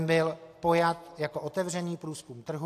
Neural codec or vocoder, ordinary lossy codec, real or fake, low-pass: none; AAC, 64 kbps; real; 14.4 kHz